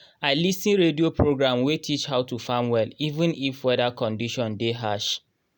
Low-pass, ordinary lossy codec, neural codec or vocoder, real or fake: 19.8 kHz; none; none; real